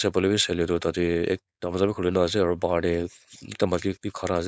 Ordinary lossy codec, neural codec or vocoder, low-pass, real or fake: none; codec, 16 kHz, 4.8 kbps, FACodec; none; fake